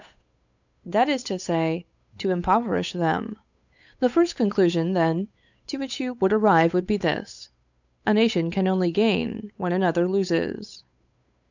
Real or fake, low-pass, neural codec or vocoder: fake; 7.2 kHz; codec, 16 kHz, 8 kbps, FunCodec, trained on Chinese and English, 25 frames a second